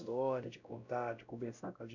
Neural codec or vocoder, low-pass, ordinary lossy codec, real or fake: codec, 16 kHz, 0.5 kbps, X-Codec, WavLM features, trained on Multilingual LibriSpeech; 7.2 kHz; none; fake